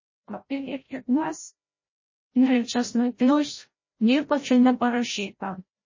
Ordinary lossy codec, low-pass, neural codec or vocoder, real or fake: MP3, 32 kbps; 7.2 kHz; codec, 16 kHz, 0.5 kbps, FreqCodec, larger model; fake